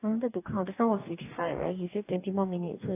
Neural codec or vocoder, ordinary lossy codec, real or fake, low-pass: codec, 44.1 kHz, 2.6 kbps, DAC; AAC, 24 kbps; fake; 3.6 kHz